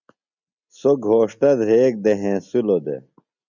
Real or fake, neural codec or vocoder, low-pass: real; none; 7.2 kHz